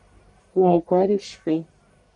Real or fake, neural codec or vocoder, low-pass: fake; codec, 44.1 kHz, 1.7 kbps, Pupu-Codec; 10.8 kHz